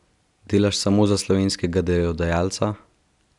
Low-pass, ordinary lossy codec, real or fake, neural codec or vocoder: 10.8 kHz; none; real; none